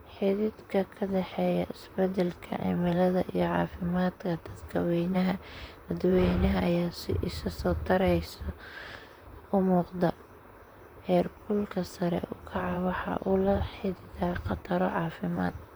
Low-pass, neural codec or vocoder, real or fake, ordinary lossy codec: none; vocoder, 44.1 kHz, 128 mel bands, Pupu-Vocoder; fake; none